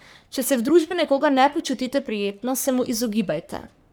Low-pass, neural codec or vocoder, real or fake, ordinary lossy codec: none; codec, 44.1 kHz, 3.4 kbps, Pupu-Codec; fake; none